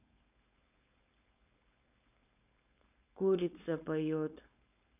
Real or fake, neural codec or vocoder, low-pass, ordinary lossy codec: fake; codec, 16 kHz, 4.8 kbps, FACodec; 3.6 kHz; none